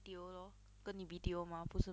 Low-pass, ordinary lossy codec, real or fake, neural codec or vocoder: none; none; real; none